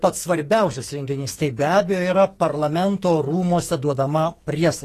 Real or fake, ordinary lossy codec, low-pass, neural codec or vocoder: fake; AAC, 48 kbps; 14.4 kHz; codec, 44.1 kHz, 2.6 kbps, SNAC